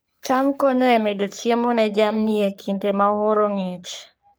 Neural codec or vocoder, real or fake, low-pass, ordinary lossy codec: codec, 44.1 kHz, 3.4 kbps, Pupu-Codec; fake; none; none